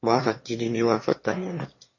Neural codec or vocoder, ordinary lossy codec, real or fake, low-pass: autoencoder, 22.05 kHz, a latent of 192 numbers a frame, VITS, trained on one speaker; MP3, 32 kbps; fake; 7.2 kHz